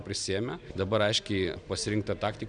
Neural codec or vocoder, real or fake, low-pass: none; real; 9.9 kHz